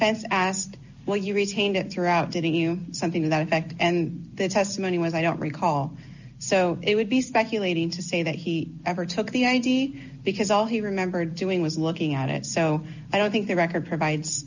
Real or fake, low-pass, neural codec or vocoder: real; 7.2 kHz; none